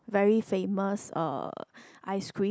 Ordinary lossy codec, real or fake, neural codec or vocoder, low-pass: none; real; none; none